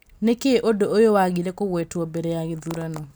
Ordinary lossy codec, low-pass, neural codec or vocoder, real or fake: none; none; none; real